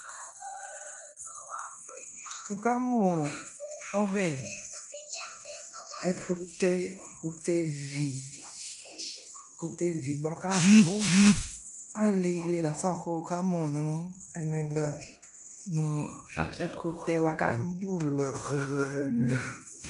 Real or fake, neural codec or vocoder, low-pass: fake; codec, 16 kHz in and 24 kHz out, 0.9 kbps, LongCat-Audio-Codec, fine tuned four codebook decoder; 10.8 kHz